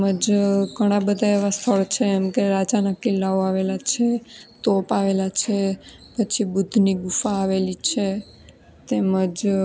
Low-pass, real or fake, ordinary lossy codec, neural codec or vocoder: none; real; none; none